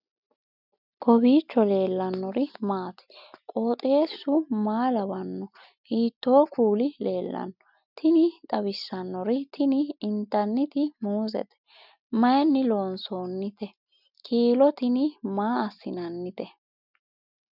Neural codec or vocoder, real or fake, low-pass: none; real; 5.4 kHz